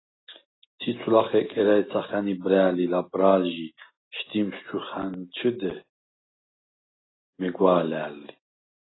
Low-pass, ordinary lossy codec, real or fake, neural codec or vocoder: 7.2 kHz; AAC, 16 kbps; real; none